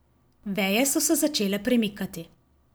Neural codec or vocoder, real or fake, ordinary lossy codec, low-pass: none; real; none; none